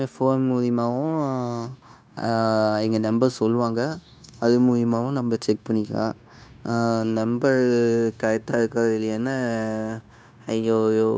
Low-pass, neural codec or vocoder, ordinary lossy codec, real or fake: none; codec, 16 kHz, 0.9 kbps, LongCat-Audio-Codec; none; fake